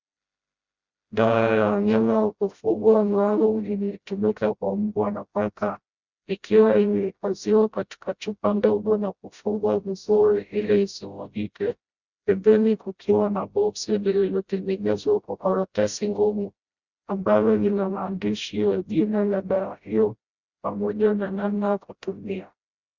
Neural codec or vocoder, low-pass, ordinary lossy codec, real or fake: codec, 16 kHz, 0.5 kbps, FreqCodec, smaller model; 7.2 kHz; Opus, 64 kbps; fake